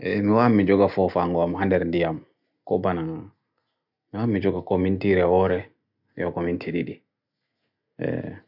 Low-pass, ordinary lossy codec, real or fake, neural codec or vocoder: 5.4 kHz; none; real; none